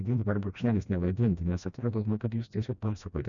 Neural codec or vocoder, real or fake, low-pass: codec, 16 kHz, 1 kbps, FreqCodec, smaller model; fake; 7.2 kHz